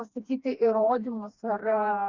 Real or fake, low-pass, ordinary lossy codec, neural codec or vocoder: fake; 7.2 kHz; Opus, 64 kbps; codec, 16 kHz, 2 kbps, FreqCodec, smaller model